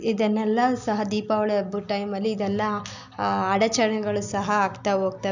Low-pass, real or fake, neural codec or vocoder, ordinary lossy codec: 7.2 kHz; real; none; none